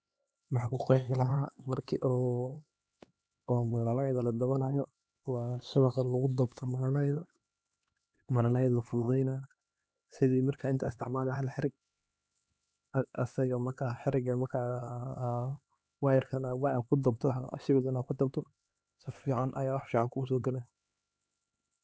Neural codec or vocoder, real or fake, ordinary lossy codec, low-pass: codec, 16 kHz, 2 kbps, X-Codec, HuBERT features, trained on LibriSpeech; fake; none; none